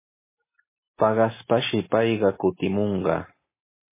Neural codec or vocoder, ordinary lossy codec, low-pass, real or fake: none; MP3, 16 kbps; 3.6 kHz; real